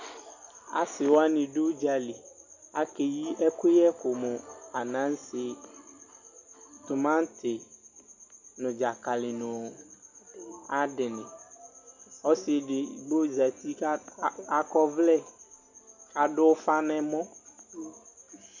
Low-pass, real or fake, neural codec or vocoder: 7.2 kHz; real; none